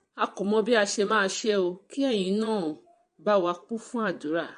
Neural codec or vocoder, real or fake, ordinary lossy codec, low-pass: vocoder, 22.05 kHz, 80 mel bands, Vocos; fake; MP3, 48 kbps; 9.9 kHz